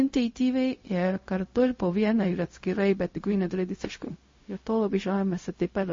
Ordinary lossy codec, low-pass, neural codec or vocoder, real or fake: MP3, 32 kbps; 7.2 kHz; codec, 16 kHz, 0.4 kbps, LongCat-Audio-Codec; fake